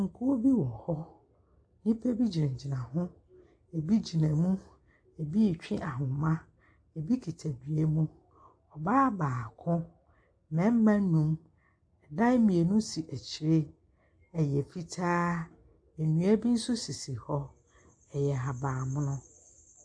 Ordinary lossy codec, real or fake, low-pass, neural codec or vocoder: AAC, 64 kbps; real; 9.9 kHz; none